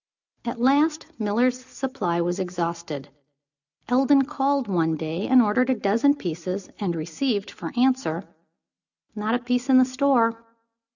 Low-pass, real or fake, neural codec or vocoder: 7.2 kHz; real; none